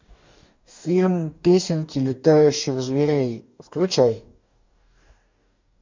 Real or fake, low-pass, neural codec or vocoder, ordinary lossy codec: fake; 7.2 kHz; codec, 44.1 kHz, 2.6 kbps, DAC; MP3, 64 kbps